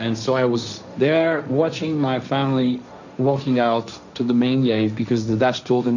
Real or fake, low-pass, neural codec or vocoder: fake; 7.2 kHz; codec, 16 kHz, 1.1 kbps, Voila-Tokenizer